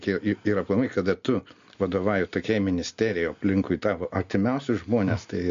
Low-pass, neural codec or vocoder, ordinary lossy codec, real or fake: 7.2 kHz; none; MP3, 48 kbps; real